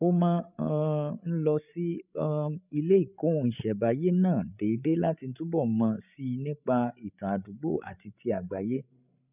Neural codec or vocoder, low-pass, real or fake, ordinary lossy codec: codec, 16 kHz, 8 kbps, FreqCodec, larger model; 3.6 kHz; fake; none